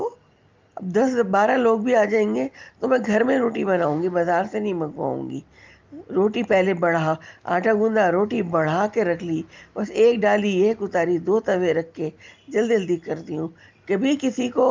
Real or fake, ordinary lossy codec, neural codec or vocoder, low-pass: real; Opus, 24 kbps; none; 7.2 kHz